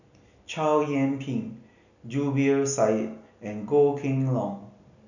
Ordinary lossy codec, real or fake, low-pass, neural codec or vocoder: none; real; 7.2 kHz; none